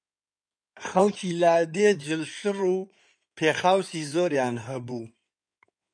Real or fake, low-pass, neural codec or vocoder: fake; 9.9 kHz; codec, 16 kHz in and 24 kHz out, 2.2 kbps, FireRedTTS-2 codec